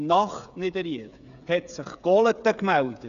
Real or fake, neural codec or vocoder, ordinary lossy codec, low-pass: fake; codec, 16 kHz, 8 kbps, FreqCodec, smaller model; none; 7.2 kHz